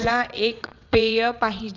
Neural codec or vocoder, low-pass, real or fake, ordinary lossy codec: vocoder, 22.05 kHz, 80 mel bands, WaveNeXt; 7.2 kHz; fake; none